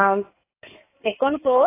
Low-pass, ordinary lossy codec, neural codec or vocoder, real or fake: 3.6 kHz; AAC, 16 kbps; codec, 16 kHz in and 24 kHz out, 2.2 kbps, FireRedTTS-2 codec; fake